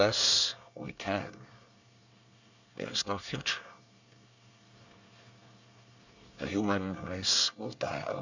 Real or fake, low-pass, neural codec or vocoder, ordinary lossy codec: fake; 7.2 kHz; codec, 24 kHz, 1 kbps, SNAC; Opus, 64 kbps